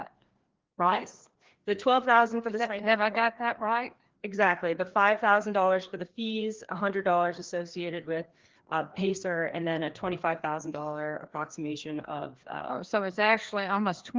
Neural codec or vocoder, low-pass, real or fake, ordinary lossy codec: codec, 16 kHz, 2 kbps, FreqCodec, larger model; 7.2 kHz; fake; Opus, 16 kbps